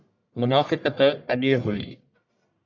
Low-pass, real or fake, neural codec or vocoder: 7.2 kHz; fake; codec, 44.1 kHz, 1.7 kbps, Pupu-Codec